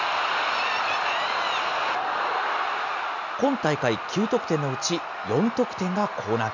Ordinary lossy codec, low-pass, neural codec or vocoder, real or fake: none; 7.2 kHz; none; real